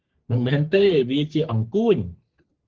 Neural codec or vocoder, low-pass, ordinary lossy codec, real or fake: codec, 44.1 kHz, 3.4 kbps, Pupu-Codec; 7.2 kHz; Opus, 16 kbps; fake